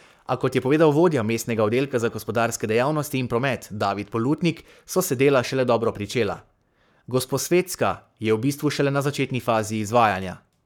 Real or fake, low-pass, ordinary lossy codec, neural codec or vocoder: fake; 19.8 kHz; none; codec, 44.1 kHz, 7.8 kbps, Pupu-Codec